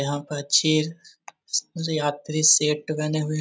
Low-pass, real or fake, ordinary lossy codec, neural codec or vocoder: none; real; none; none